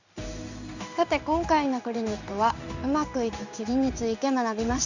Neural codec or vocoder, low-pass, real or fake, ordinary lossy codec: codec, 16 kHz in and 24 kHz out, 1 kbps, XY-Tokenizer; 7.2 kHz; fake; none